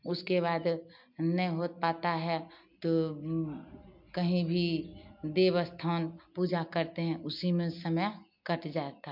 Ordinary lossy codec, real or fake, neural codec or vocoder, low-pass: MP3, 48 kbps; real; none; 5.4 kHz